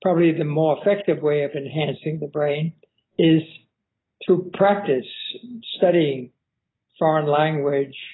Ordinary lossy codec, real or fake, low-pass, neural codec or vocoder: AAC, 16 kbps; real; 7.2 kHz; none